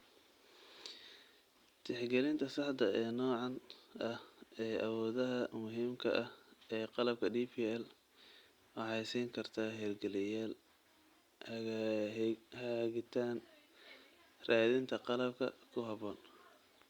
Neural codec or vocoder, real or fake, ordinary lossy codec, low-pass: none; real; Opus, 64 kbps; 19.8 kHz